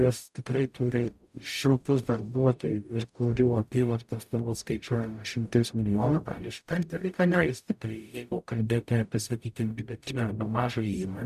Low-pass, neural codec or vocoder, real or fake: 14.4 kHz; codec, 44.1 kHz, 0.9 kbps, DAC; fake